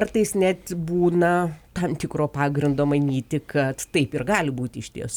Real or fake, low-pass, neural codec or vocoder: real; 19.8 kHz; none